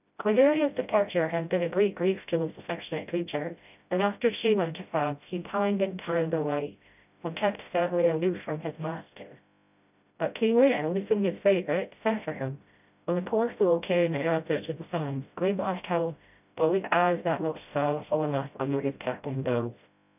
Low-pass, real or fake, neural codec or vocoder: 3.6 kHz; fake; codec, 16 kHz, 0.5 kbps, FreqCodec, smaller model